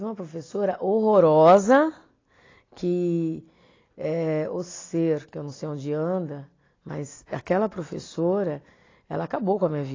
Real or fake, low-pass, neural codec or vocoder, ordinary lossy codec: real; 7.2 kHz; none; AAC, 32 kbps